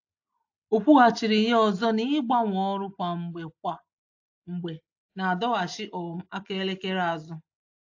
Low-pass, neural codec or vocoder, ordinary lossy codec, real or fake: 7.2 kHz; none; AAC, 48 kbps; real